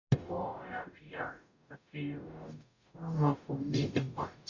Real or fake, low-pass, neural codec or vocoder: fake; 7.2 kHz; codec, 44.1 kHz, 0.9 kbps, DAC